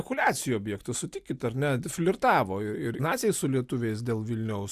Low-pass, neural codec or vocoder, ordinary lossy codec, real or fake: 14.4 kHz; none; Opus, 64 kbps; real